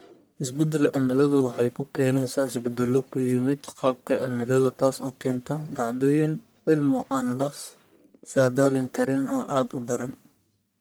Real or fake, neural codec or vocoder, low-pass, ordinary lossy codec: fake; codec, 44.1 kHz, 1.7 kbps, Pupu-Codec; none; none